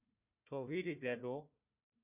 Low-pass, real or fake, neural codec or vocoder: 3.6 kHz; fake; codec, 16 kHz, 1 kbps, FunCodec, trained on Chinese and English, 50 frames a second